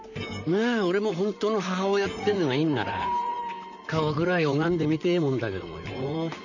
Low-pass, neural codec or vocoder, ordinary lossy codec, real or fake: 7.2 kHz; vocoder, 44.1 kHz, 128 mel bands, Pupu-Vocoder; none; fake